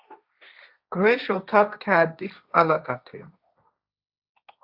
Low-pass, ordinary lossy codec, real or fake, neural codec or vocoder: 5.4 kHz; Opus, 64 kbps; fake; codec, 16 kHz, 1.1 kbps, Voila-Tokenizer